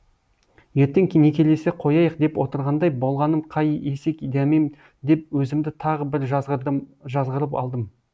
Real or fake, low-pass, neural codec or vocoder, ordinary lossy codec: real; none; none; none